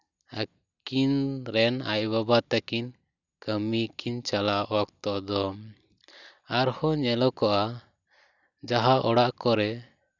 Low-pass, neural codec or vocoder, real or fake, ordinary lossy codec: 7.2 kHz; none; real; none